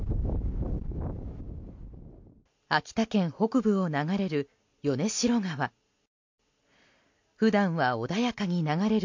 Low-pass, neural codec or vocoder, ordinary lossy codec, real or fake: 7.2 kHz; none; MP3, 48 kbps; real